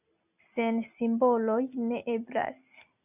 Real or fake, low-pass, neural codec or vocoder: real; 3.6 kHz; none